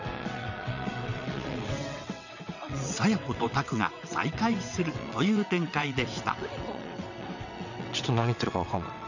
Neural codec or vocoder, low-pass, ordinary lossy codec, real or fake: vocoder, 22.05 kHz, 80 mel bands, WaveNeXt; 7.2 kHz; none; fake